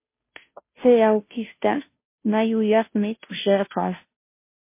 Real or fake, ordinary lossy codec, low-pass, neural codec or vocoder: fake; MP3, 24 kbps; 3.6 kHz; codec, 16 kHz, 0.5 kbps, FunCodec, trained on Chinese and English, 25 frames a second